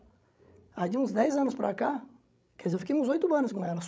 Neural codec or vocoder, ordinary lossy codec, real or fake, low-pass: codec, 16 kHz, 16 kbps, FreqCodec, larger model; none; fake; none